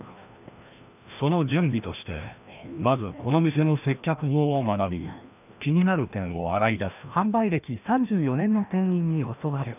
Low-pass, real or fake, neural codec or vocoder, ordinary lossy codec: 3.6 kHz; fake; codec, 16 kHz, 1 kbps, FreqCodec, larger model; none